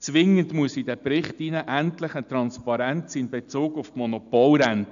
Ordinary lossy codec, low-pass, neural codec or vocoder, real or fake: none; 7.2 kHz; none; real